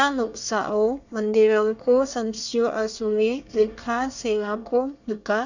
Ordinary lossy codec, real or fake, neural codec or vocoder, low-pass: none; fake; codec, 24 kHz, 1 kbps, SNAC; 7.2 kHz